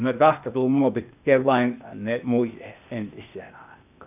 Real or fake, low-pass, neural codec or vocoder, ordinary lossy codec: fake; 3.6 kHz; codec, 16 kHz, 0.8 kbps, ZipCodec; none